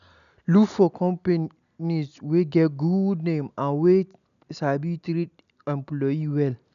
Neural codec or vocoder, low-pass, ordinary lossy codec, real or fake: none; 7.2 kHz; none; real